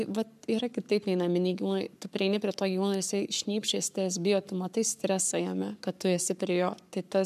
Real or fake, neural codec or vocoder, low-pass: fake; codec, 44.1 kHz, 7.8 kbps, Pupu-Codec; 14.4 kHz